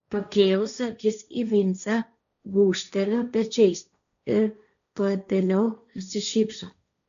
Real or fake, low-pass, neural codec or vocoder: fake; 7.2 kHz; codec, 16 kHz, 1.1 kbps, Voila-Tokenizer